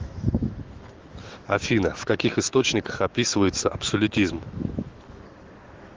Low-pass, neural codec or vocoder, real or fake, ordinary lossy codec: 7.2 kHz; autoencoder, 48 kHz, 128 numbers a frame, DAC-VAE, trained on Japanese speech; fake; Opus, 16 kbps